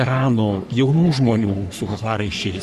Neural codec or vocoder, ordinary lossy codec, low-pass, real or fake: codec, 44.1 kHz, 3.4 kbps, Pupu-Codec; MP3, 96 kbps; 14.4 kHz; fake